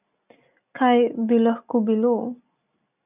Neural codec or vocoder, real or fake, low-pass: none; real; 3.6 kHz